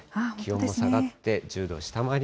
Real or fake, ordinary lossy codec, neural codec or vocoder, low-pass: real; none; none; none